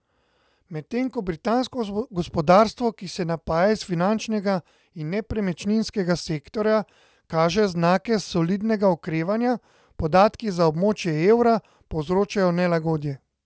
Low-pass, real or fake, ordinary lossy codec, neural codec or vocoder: none; real; none; none